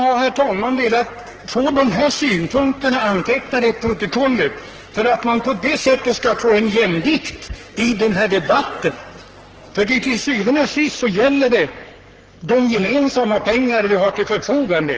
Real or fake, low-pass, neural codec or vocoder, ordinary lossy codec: fake; 7.2 kHz; codec, 44.1 kHz, 3.4 kbps, Pupu-Codec; Opus, 16 kbps